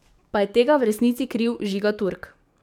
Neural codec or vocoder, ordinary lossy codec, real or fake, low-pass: codec, 44.1 kHz, 7.8 kbps, DAC; none; fake; 19.8 kHz